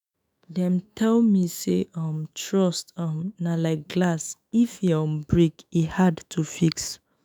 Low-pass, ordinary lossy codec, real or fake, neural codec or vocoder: none; none; fake; autoencoder, 48 kHz, 128 numbers a frame, DAC-VAE, trained on Japanese speech